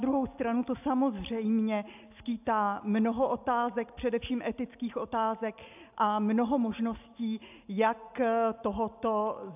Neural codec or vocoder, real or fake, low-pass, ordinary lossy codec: none; real; 3.6 kHz; AAC, 32 kbps